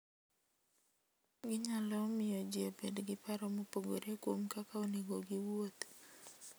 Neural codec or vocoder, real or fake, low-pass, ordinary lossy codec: none; real; none; none